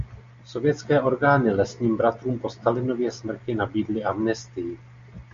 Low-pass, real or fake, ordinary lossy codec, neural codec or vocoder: 7.2 kHz; real; MP3, 48 kbps; none